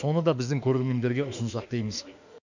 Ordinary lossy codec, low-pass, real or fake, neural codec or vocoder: none; 7.2 kHz; fake; autoencoder, 48 kHz, 32 numbers a frame, DAC-VAE, trained on Japanese speech